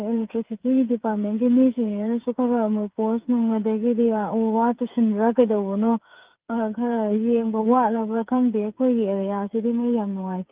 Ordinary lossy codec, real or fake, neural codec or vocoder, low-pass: Opus, 32 kbps; fake; codec, 16 kHz, 8 kbps, FreqCodec, smaller model; 3.6 kHz